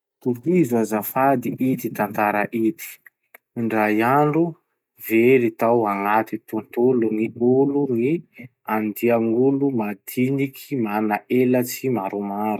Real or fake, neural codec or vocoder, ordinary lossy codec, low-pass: real; none; none; 19.8 kHz